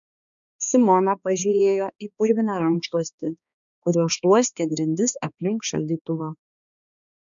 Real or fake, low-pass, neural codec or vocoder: fake; 7.2 kHz; codec, 16 kHz, 2 kbps, X-Codec, HuBERT features, trained on balanced general audio